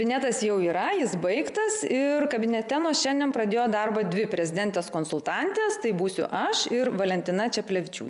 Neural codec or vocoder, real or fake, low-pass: vocoder, 24 kHz, 100 mel bands, Vocos; fake; 10.8 kHz